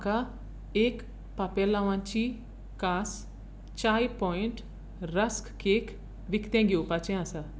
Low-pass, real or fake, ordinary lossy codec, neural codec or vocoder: none; real; none; none